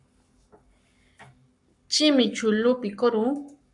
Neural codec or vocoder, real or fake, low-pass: codec, 44.1 kHz, 7.8 kbps, Pupu-Codec; fake; 10.8 kHz